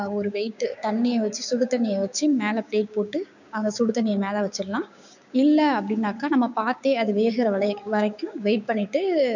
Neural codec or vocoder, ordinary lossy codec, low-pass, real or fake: codec, 16 kHz, 6 kbps, DAC; none; 7.2 kHz; fake